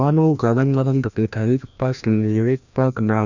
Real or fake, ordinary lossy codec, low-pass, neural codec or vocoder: fake; none; 7.2 kHz; codec, 16 kHz, 1 kbps, FreqCodec, larger model